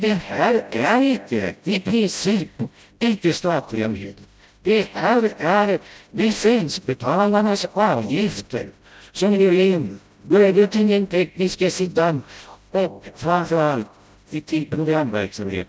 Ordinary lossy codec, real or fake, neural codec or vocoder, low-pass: none; fake; codec, 16 kHz, 0.5 kbps, FreqCodec, smaller model; none